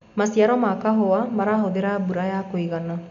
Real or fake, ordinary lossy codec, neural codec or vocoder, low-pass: real; none; none; 7.2 kHz